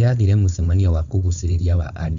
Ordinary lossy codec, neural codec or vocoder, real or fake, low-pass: none; codec, 16 kHz, 4.8 kbps, FACodec; fake; 7.2 kHz